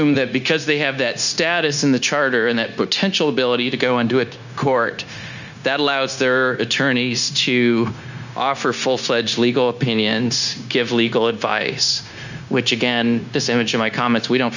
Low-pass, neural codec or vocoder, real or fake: 7.2 kHz; codec, 16 kHz, 0.9 kbps, LongCat-Audio-Codec; fake